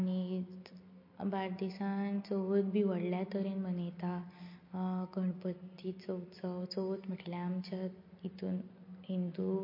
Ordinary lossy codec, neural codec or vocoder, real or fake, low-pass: MP3, 32 kbps; none; real; 5.4 kHz